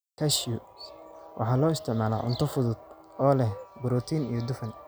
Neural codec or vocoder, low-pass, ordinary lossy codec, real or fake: none; none; none; real